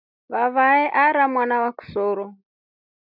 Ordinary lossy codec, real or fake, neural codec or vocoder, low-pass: AAC, 48 kbps; real; none; 5.4 kHz